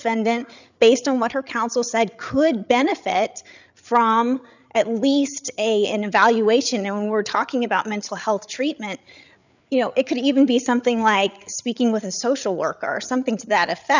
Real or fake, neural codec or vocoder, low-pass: fake; codec, 16 kHz, 8 kbps, FreqCodec, larger model; 7.2 kHz